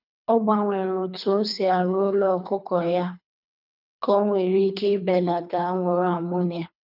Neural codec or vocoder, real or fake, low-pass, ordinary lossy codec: codec, 24 kHz, 3 kbps, HILCodec; fake; 5.4 kHz; none